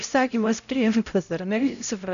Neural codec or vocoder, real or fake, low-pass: codec, 16 kHz, 0.5 kbps, X-Codec, HuBERT features, trained on LibriSpeech; fake; 7.2 kHz